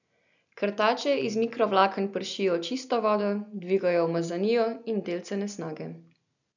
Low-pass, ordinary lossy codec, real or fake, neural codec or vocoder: 7.2 kHz; none; real; none